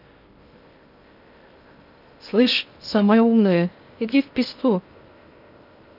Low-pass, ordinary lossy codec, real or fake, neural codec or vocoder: 5.4 kHz; none; fake; codec, 16 kHz in and 24 kHz out, 0.6 kbps, FocalCodec, streaming, 2048 codes